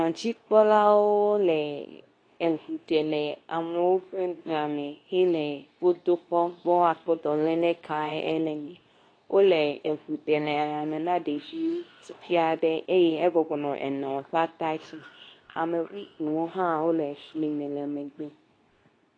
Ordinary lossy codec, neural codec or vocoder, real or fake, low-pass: AAC, 32 kbps; codec, 24 kHz, 0.9 kbps, WavTokenizer, medium speech release version 2; fake; 9.9 kHz